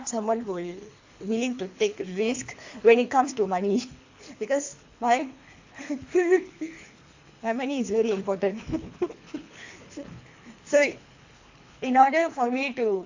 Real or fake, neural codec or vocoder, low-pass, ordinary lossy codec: fake; codec, 24 kHz, 3 kbps, HILCodec; 7.2 kHz; AAC, 48 kbps